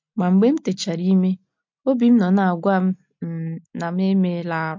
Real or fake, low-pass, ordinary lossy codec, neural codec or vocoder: real; 7.2 kHz; MP3, 48 kbps; none